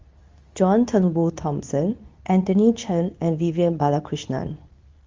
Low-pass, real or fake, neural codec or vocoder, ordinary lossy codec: 7.2 kHz; fake; codec, 24 kHz, 0.9 kbps, WavTokenizer, medium speech release version 2; Opus, 32 kbps